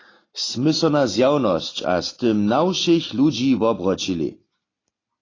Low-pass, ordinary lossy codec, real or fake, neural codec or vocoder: 7.2 kHz; AAC, 32 kbps; real; none